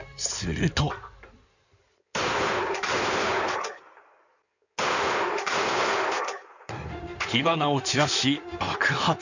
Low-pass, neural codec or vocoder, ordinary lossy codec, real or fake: 7.2 kHz; codec, 16 kHz in and 24 kHz out, 2.2 kbps, FireRedTTS-2 codec; none; fake